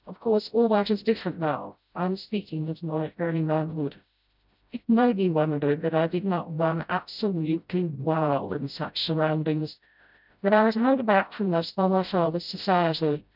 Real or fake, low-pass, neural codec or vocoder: fake; 5.4 kHz; codec, 16 kHz, 0.5 kbps, FreqCodec, smaller model